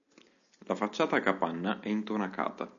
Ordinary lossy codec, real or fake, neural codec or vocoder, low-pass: MP3, 64 kbps; real; none; 7.2 kHz